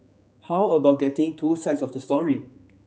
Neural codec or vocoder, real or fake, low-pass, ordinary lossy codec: codec, 16 kHz, 4 kbps, X-Codec, HuBERT features, trained on general audio; fake; none; none